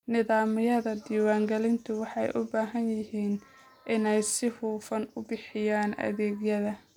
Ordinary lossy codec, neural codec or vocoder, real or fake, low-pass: none; none; real; 19.8 kHz